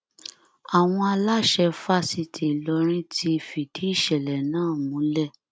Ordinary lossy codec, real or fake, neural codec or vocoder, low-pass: none; real; none; none